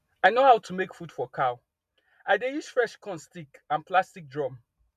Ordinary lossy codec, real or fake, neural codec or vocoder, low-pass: MP3, 96 kbps; real; none; 14.4 kHz